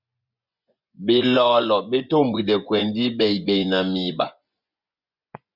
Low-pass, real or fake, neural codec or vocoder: 5.4 kHz; fake; vocoder, 24 kHz, 100 mel bands, Vocos